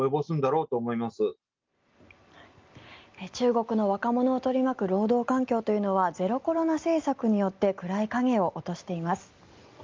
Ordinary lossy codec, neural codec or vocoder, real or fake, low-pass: Opus, 32 kbps; none; real; 7.2 kHz